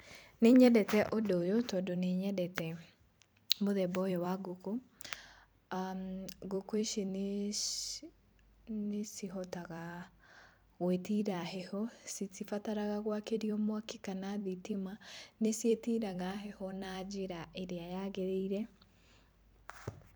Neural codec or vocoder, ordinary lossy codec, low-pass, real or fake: vocoder, 44.1 kHz, 128 mel bands every 256 samples, BigVGAN v2; none; none; fake